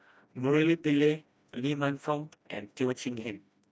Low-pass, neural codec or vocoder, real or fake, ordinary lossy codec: none; codec, 16 kHz, 1 kbps, FreqCodec, smaller model; fake; none